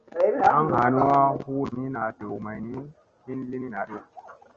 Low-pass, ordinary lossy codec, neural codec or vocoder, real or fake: 7.2 kHz; Opus, 24 kbps; none; real